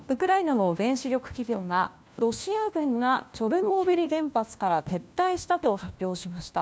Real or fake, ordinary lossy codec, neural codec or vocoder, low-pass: fake; none; codec, 16 kHz, 1 kbps, FunCodec, trained on LibriTTS, 50 frames a second; none